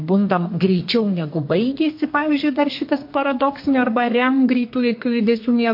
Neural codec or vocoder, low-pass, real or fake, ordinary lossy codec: codec, 32 kHz, 1.9 kbps, SNAC; 5.4 kHz; fake; MP3, 32 kbps